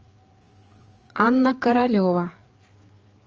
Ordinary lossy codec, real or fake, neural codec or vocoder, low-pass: Opus, 24 kbps; fake; codec, 16 kHz, 4 kbps, FreqCodec, larger model; 7.2 kHz